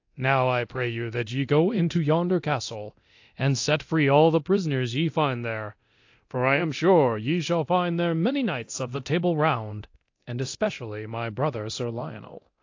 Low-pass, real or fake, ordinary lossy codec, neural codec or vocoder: 7.2 kHz; fake; AAC, 48 kbps; codec, 24 kHz, 0.9 kbps, DualCodec